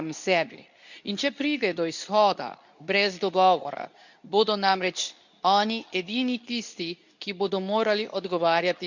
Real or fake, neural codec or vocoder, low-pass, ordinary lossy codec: fake; codec, 24 kHz, 0.9 kbps, WavTokenizer, medium speech release version 2; 7.2 kHz; none